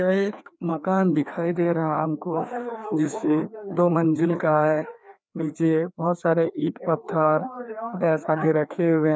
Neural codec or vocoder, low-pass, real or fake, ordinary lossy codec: codec, 16 kHz, 2 kbps, FreqCodec, larger model; none; fake; none